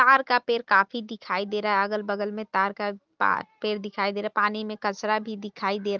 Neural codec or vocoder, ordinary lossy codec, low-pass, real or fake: none; Opus, 32 kbps; 7.2 kHz; real